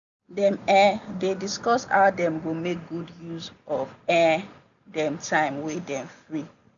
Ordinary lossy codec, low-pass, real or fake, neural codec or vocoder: none; 7.2 kHz; real; none